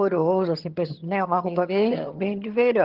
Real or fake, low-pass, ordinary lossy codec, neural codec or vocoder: fake; 5.4 kHz; Opus, 16 kbps; vocoder, 22.05 kHz, 80 mel bands, HiFi-GAN